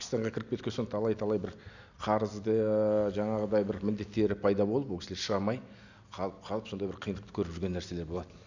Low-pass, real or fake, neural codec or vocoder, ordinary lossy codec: 7.2 kHz; real; none; none